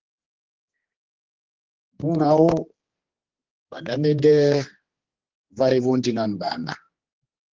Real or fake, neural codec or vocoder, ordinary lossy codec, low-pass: fake; codec, 16 kHz, 2 kbps, X-Codec, HuBERT features, trained on general audio; Opus, 16 kbps; 7.2 kHz